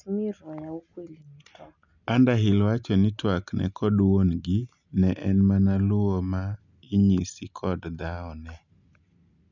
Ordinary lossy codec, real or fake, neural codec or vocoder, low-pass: none; real; none; 7.2 kHz